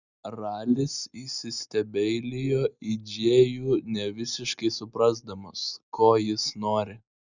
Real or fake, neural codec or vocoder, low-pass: real; none; 7.2 kHz